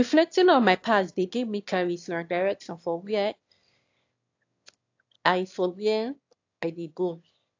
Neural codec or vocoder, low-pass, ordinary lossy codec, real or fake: autoencoder, 22.05 kHz, a latent of 192 numbers a frame, VITS, trained on one speaker; 7.2 kHz; AAC, 48 kbps; fake